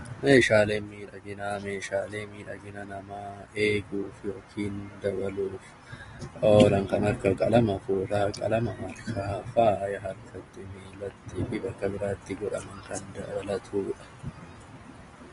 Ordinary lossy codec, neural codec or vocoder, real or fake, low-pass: AAC, 32 kbps; none; real; 10.8 kHz